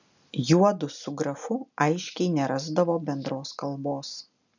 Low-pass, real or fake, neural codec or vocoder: 7.2 kHz; real; none